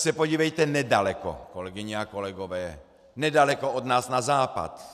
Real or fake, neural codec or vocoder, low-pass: real; none; 14.4 kHz